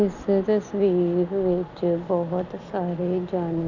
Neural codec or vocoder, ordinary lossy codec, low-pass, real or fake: none; none; 7.2 kHz; real